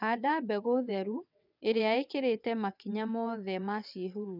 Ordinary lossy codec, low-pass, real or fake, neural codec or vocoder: none; 5.4 kHz; fake; vocoder, 22.05 kHz, 80 mel bands, WaveNeXt